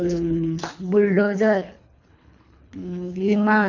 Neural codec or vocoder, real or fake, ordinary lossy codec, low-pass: codec, 24 kHz, 3 kbps, HILCodec; fake; none; 7.2 kHz